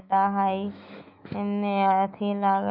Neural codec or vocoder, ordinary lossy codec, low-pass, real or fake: autoencoder, 48 kHz, 128 numbers a frame, DAC-VAE, trained on Japanese speech; none; 5.4 kHz; fake